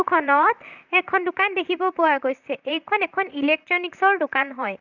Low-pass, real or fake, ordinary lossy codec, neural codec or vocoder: 7.2 kHz; fake; none; vocoder, 44.1 kHz, 128 mel bands, Pupu-Vocoder